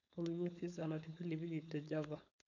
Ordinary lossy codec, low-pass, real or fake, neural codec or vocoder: none; 7.2 kHz; fake; codec, 16 kHz, 4.8 kbps, FACodec